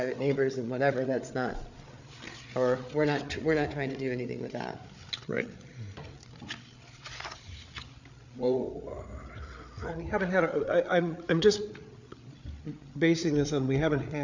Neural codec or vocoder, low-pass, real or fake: codec, 16 kHz, 8 kbps, FreqCodec, larger model; 7.2 kHz; fake